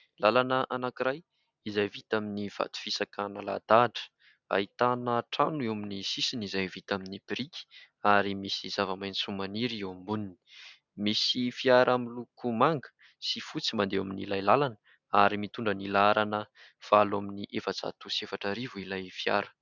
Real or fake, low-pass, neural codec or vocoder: real; 7.2 kHz; none